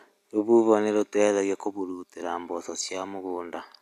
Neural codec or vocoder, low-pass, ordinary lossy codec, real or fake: none; 14.4 kHz; none; real